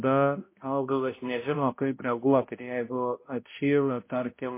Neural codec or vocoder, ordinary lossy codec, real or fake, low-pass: codec, 16 kHz, 0.5 kbps, X-Codec, HuBERT features, trained on balanced general audio; MP3, 24 kbps; fake; 3.6 kHz